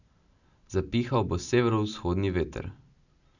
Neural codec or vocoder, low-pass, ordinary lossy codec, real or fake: none; 7.2 kHz; none; real